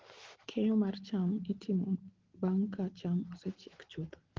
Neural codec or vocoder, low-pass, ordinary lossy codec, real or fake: none; 7.2 kHz; Opus, 16 kbps; real